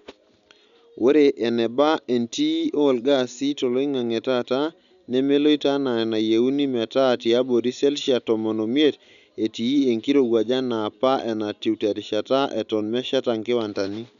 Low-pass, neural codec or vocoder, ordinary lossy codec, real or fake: 7.2 kHz; none; none; real